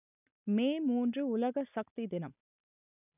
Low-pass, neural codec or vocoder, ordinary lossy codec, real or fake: 3.6 kHz; none; none; real